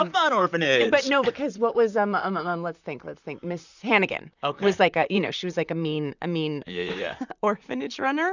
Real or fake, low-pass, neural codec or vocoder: fake; 7.2 kHz; vocoder, 44.1 kHz, 128 mel bands, Pupu-Vocoder